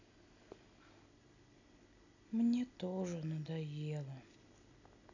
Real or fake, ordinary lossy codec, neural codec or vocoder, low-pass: real; none; none; 7.2 kHz